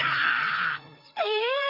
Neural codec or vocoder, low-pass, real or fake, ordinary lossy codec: codec, 16 kHz, 4 kbps, FreqCodec, larger model; 5.4 kHz; fake; none